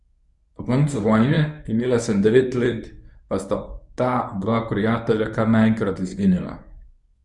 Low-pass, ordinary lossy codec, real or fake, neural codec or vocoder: 10.8 kHz; none; fake; codec, 24 kHz, 0.9 kbps, WavTokenizer, medium speech release version 1